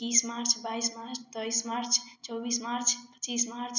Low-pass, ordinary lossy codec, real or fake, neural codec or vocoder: 7.2 kHz; none; real; none